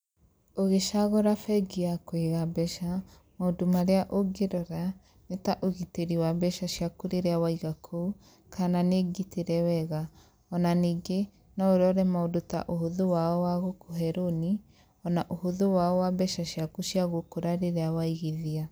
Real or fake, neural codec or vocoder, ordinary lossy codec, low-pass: real; none; none; none